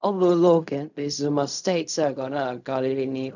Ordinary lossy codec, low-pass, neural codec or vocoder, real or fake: none; 7.2 kHz; codec, 16 kHz in and 24 kHz out, 0.4 kbps, LongCat-Audio-Codec, fine tuned four codebook decoder; fake